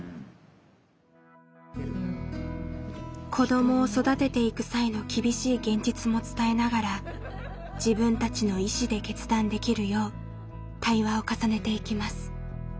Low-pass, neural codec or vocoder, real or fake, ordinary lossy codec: none; none; real; none